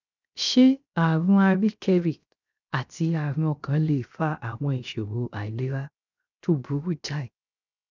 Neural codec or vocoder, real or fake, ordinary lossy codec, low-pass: codec, 16 kHz, 0.7 kbps, FocalCodec; fake; none; 7.2 kHz